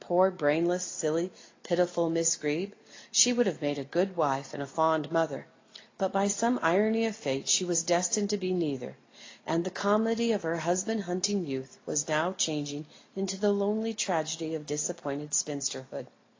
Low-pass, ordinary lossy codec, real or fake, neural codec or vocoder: 7.2 kHz; AAC, 32 kbps; real; none